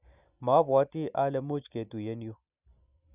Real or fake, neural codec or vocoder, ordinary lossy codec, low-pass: real; none; none; 3.6 kHz